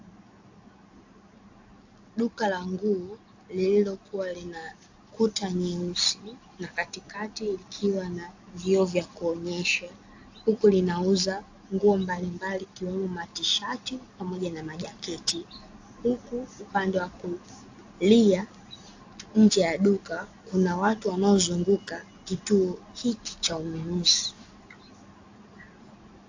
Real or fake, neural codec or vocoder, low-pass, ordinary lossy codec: real; none; 7.2 kHz; AAC, 48 kbps